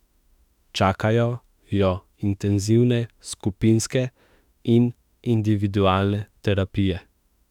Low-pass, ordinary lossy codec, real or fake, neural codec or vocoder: 19.8 kHz; none; fake; autoencoder, 48 kHz, 32 numbers a frame, DAC-VAE, trained on Japanese speech